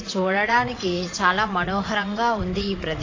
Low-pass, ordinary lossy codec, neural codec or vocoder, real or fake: 7.2 kHz; AAC, 32 kbps; vocoder, 22.05 kHz, 80 mel bands, Vocos; fake